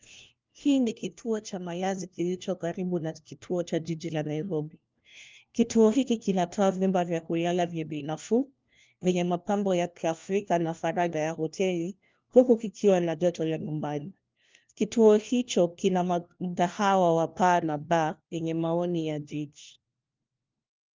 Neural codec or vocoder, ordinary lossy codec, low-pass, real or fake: codec, 16 kHz, 1 kbps, FunCodec, trained on LibriTTS, 50 frames a second; Opus, 24 kbps; 7.2 kHz; fake